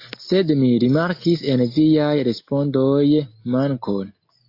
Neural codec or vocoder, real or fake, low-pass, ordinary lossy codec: none; real; 5.4 kHz; AAC, 32 kbps